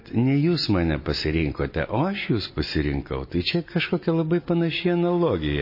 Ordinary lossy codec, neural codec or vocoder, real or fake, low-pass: MP3, 24 kbps; none; real; 5.4 kHz